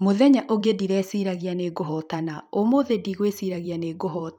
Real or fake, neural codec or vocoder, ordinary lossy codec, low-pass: fake; vocoder, 44.1 kHz, 128 mel bands every 512 samples, BigVGAN v2; none; 19.8 kHz